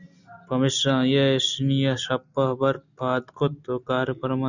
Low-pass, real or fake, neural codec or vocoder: 7.2 kHz; real; none